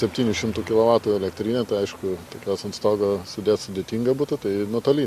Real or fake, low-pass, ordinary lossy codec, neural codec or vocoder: real; 14.4 kHz; Opus, 64 kbps; none